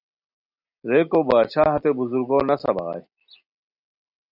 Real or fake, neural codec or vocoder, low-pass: real; none; 5.4 kHz